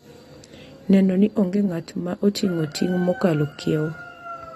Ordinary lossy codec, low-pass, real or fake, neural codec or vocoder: AAC, 32 kbps; 19.8 kHz; real; none